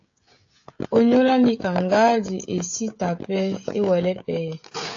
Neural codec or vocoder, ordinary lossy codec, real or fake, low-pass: codec, 16 kHz, 16 kbps, FreqCodec, smaller model; AAC, 48 kbps; fake; 7.2 kHz